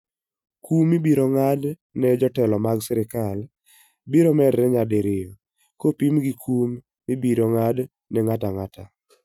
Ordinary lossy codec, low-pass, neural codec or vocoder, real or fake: none; 19.8 kHz; none; real